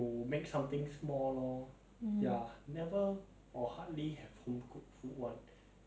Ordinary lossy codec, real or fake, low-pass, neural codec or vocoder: none; real; none; none